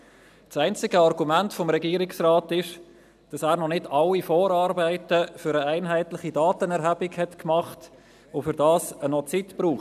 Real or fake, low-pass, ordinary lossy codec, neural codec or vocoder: real; 14.4 kHz; none; none